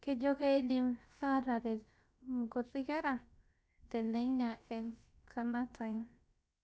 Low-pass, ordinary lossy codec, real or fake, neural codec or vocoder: none; none; fake; codec, 16 kHz, about 1 kbps, DyCAST, with the encoder's durations